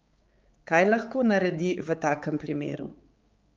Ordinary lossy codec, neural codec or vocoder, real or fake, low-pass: Opus, 32 kbps; codec, 16 kHz, 4 kbps, X-Codec, HuBERT features, trained on balanced general audio; fake; 7.2 kHz